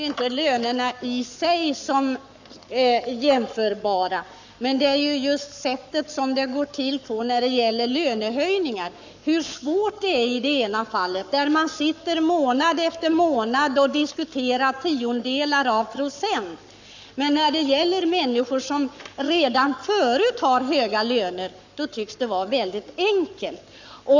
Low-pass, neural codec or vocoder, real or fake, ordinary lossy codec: 7.2 kHz; codec, 44.1 kHz, 7.8 kbps, Pupu-Codec; fake; none